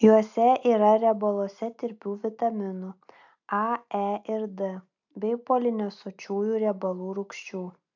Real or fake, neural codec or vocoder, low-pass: real; none; 7.2 kHz